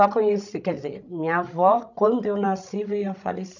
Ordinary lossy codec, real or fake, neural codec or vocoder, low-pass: none; fake; codec, 16 kHz, 16 kbps, FreqCodec, larger model; 7.2 kHz